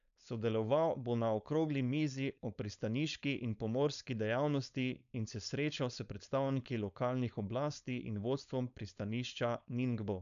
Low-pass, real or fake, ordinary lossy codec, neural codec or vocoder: 7.2 kHz; fake; none; codec, 16 kHz, 4.8 kbps, FACodec